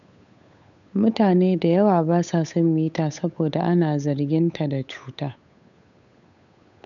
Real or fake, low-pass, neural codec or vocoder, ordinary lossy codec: fake; 7.2 kHz; codec, 16 kHz, 8 kbps, FunCodec, trained on Chinese and English, 25 frames a second; none